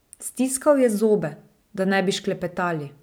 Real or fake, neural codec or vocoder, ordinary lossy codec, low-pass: real; none; none; none